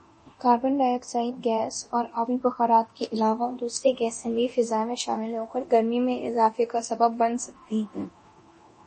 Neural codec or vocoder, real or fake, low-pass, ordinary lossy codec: codec, 24 kHz, 0.9 kbps, DualCodec; fake; 10.8 kHz; MP3, 32 kbps